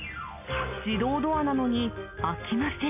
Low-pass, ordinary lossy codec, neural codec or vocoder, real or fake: 3.6 kHz; AAC, 32 kbps; none; real